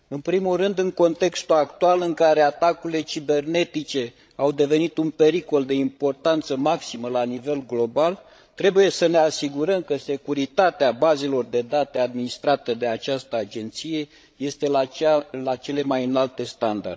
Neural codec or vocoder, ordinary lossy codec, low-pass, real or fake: codec, 16 kHz, 16 kbps, FreqCodec, larger model; none; none; fake